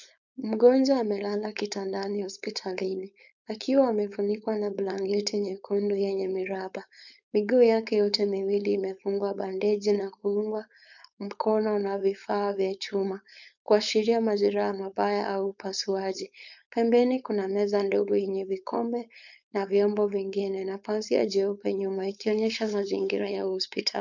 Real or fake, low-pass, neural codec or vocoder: fake; 7.2 kHz; codec, 16 kHz, 4.8 kbps, FACodec